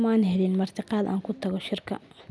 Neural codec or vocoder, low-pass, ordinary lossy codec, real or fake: none; none; none; real